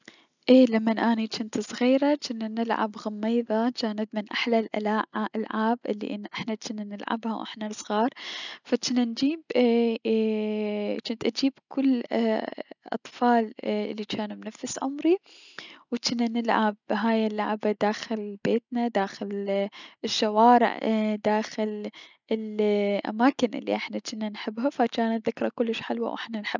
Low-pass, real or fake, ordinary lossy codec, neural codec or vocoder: 7.2 kHz; real; none; none